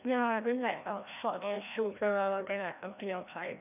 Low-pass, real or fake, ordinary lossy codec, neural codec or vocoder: 3.6 kHz; fake; none; codec, 16 kHz, 1 kbps, FreqCodec, larger model